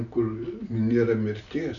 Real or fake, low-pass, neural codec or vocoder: real; 7.2 kHz; none